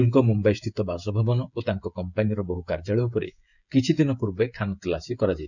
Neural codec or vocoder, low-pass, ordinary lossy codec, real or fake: codec, 16 kHz, 16 kbps, FreqCodec, smaller model; 7.2 kHz; none; fake